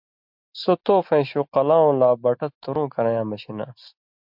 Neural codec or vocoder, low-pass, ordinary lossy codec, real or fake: none; 5.4 kHz; MP3, 48 kbps; real